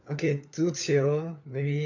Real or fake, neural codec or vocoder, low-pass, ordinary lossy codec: fake; codec, 16 kHz, 4 kbps, FunCodec, trained on LibriTTS, 50 frames a second; 7.2 kHz; none